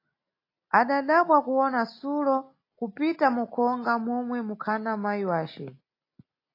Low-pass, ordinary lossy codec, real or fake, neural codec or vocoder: 5.4 kHz; AAC, 32 kbps; real; none